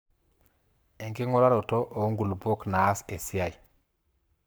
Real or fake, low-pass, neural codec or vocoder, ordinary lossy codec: fake; none; codec, 44.1 kHz, 7.8 kbps, Pupu-Codec; none